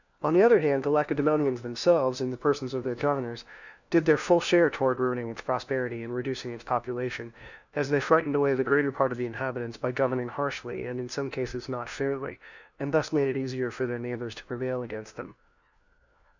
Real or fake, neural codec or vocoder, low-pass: fake; codec, 16 kHz, 1 kbps, FunCodec, trained on LibriTTS, 50 frames a second; 7.2 kHz